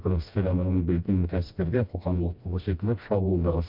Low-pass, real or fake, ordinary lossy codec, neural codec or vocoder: 5.4 kHz; fake; MP3, 48 kbps; codec, 16 kHz, 1 kbps, FreqCodec, smaller model